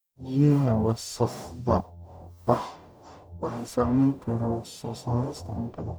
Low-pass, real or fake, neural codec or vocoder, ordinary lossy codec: none; fake; codec, 44.1 kHz, 0.9 kbps, DAC; none